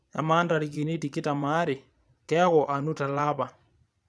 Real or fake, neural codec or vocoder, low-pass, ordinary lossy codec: fake; vocoder, 22.05 kHz, 80 mel bands, Vocos; none; none